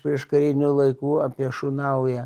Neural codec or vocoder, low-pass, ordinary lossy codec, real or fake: none; 14.4 kHz; Opus, 24 kbps; real